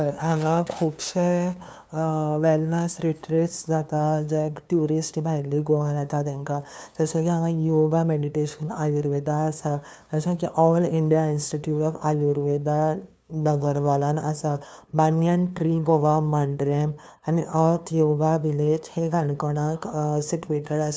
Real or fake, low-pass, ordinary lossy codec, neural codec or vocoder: fake; none; none; codec, 16 kHz, 2 kbps, FunCodec, trained on LibriTTS, 25 frames a second